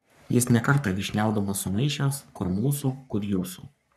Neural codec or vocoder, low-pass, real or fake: codec, 44.1 kHz, 3.4 kbps, Pupu-Codec; 14.4 kHz; fake